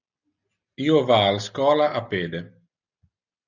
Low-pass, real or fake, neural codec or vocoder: 7.2 kHz; real; none